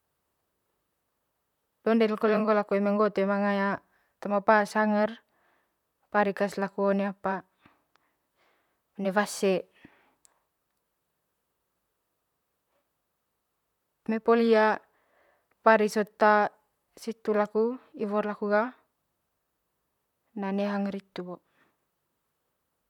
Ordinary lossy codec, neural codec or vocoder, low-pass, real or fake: none; vocoder, 44.1 kHz, 128 mel bands, Pupu-Vocoder; 19.8 kHz; fake